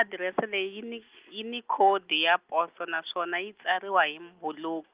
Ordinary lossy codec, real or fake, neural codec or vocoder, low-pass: Opus, 32 kbps; real; none; 3.6 kHz